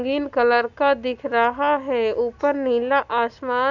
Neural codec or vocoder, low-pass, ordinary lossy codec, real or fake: none; 7.2 kHz; none; real